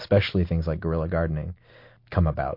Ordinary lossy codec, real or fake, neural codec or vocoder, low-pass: MP3, 32 kbps; real; none; 5.4 kHz